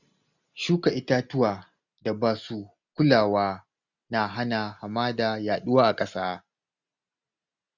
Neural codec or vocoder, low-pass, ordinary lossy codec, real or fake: none; 7.2 kHz; none; real